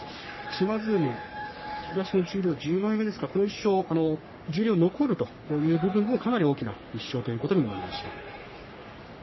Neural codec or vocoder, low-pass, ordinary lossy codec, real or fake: codec, 44.1 kHz, 3.4 kbps, Pupu-Codec; 7.2 kHz; MP3, 24 kbps; fake